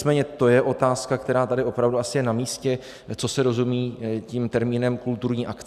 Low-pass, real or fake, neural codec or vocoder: 14.4 kHz; real; none